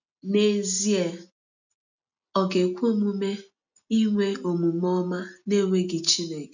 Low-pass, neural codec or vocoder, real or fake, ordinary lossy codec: 7.2 kHz; none; real; none